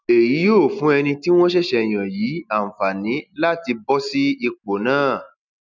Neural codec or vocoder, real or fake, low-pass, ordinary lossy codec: none; real; 7.2 kHz; none